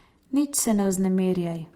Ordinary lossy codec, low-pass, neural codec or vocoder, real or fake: Opus, 24 kbps; 19.8 kHz; vocoder, 48 kHz, 128 mel bands, Vocos; fake